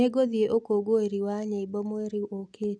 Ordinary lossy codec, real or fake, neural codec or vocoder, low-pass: none; real; none; none